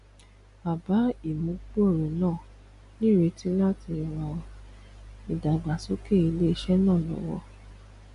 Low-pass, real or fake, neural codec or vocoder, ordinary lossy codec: 10.8 kHz; real; none; MP3, 64 kbps